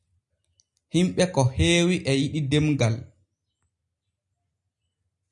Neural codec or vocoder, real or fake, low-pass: none; real; 10.8 kHz